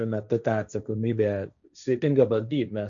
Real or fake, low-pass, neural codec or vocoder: fake; 7.2 kHz; codec, 16 kHz, 1.1 kbps, Voila-Tokenizer